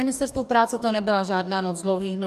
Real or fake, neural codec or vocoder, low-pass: fake; codec, 44.1 kHz, 2.6 kbps, DAC; 14.4 kHz